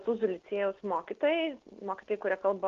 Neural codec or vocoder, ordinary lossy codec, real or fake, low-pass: none; Opus, 32 kbps; real; 7.2 kHz